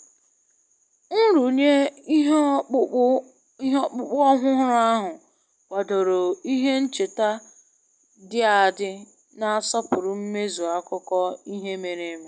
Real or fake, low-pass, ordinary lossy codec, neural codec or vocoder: real; none; none; none